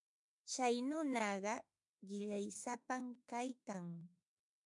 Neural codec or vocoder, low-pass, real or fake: autoencoder, 48 kHz, 32 numbers a frame, DAC-VAE, trained on Japanese speech; 10.8 kHz; fake